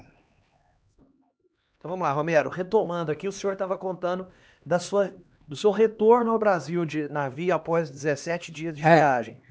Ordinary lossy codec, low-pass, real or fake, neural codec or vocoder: none; none; fake; codec, 16 kHz, 2 kbps, X-Codec, HuBERT features, trained on LibriSpeech